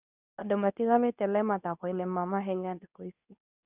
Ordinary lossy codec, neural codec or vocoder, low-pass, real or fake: none; codec, 24 kHz, 0.9 kbps, WavTokenizer, medium speech release version 2; 3.6 kHz; fake